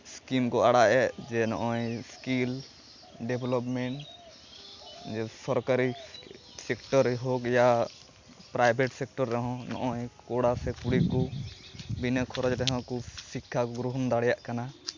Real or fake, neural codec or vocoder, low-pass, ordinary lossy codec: real; none; 7.2 kHz; MP3, 64 kbps